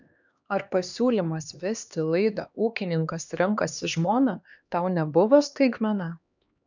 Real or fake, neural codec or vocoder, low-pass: fake; codec, 16 kHz, 2 kbps, X-Codec, HuBERT features, trained on LibriSpeech; 7.2 kHz